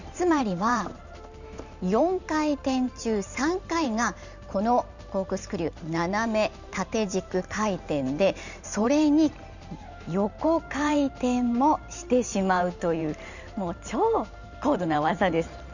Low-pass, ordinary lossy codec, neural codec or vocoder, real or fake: 7.2 kHz; none; vocoder, 44.1 kHz, 128 mel bands every 512 samples, BigVGAN v2; fake